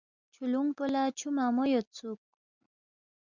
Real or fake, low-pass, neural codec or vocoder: real; 7.2 kHz; none